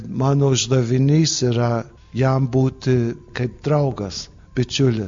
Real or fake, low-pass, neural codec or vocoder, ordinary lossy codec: real; 7.2 kHz; none; MP3, 48 kbps